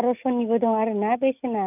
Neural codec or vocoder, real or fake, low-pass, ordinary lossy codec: none; real; 3.6 kHz; none